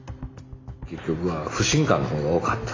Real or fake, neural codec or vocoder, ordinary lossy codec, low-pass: real; none; AAC, 32 kbps; 7.2 kHz